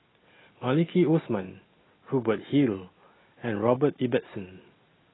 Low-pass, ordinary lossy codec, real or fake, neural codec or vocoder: 7.2 kHz; AAC, 16 kbps; real; none